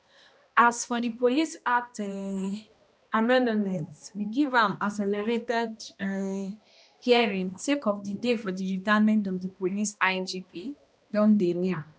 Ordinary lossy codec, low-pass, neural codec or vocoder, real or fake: none; none; codec, 16 kHz, 1 kbps, X-Codec, HuBERT features, trained on balanced general audio; fake